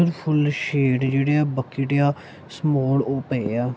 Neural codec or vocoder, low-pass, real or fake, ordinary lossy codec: none; none; real; none